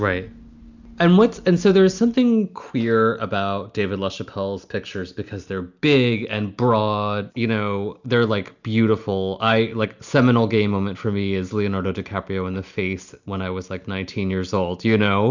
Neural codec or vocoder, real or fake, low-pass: none; real; 7.2 kHz